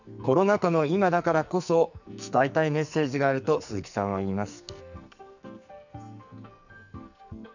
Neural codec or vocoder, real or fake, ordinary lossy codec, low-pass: codec, 44.1 kHz, 2.6 kbps, SNAC; fake; none; 7.2 kHz